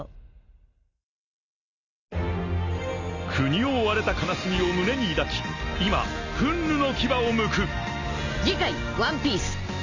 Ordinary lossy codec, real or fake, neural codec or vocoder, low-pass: none; real; none; 7.2 kHz